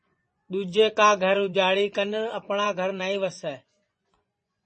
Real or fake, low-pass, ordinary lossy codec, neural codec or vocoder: fake; 10.8 kHz; MP3, 32 kbps; vocoder, 24 kHz, 100 mel bands, Vocos